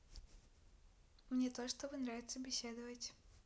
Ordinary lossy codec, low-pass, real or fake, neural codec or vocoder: none; none; real; none